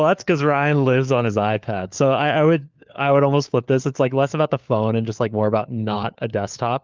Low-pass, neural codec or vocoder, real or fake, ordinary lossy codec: 7.2 kHz; codec, 16 kHz, 4 kbps, FunCodec, trained on LibriTTS, 50 frames a second; fake; Opus, 24 kbps